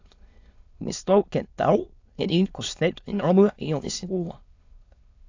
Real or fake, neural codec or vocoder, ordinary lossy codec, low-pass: fake; autoencoder, 22.05 kHz, a latent of 192 numbers a frame, VITS, trained on many speakers; AAC, 48 kbps; 7.2 kHz